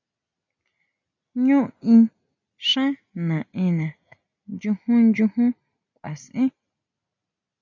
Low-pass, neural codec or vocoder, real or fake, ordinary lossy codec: 7.2 kHz; none; real; MP3, 64 kbps